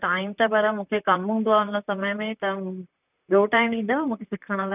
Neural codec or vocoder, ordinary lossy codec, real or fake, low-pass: vocoder, 44.1 kHz, 128 mel bands, Pupu-Vocoder; none; fake; 3.6 kHz